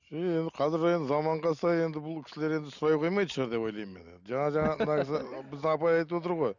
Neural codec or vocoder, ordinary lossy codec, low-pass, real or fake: none; none; 7.2 kHz; real